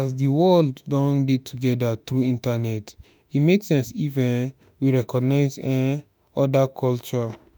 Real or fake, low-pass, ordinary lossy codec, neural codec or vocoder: fake; none; none; autoencoder, 48 kHz, 32 numbers a frame, DAC-VAE, trained on Japanese speech